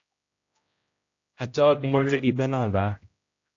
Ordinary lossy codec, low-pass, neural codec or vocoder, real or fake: AAC, 48 kbps; 7.2 kHz; codec, 16 kHz, 0.5 kbps, X-Codec, HuBERT features, trained on general audio; fake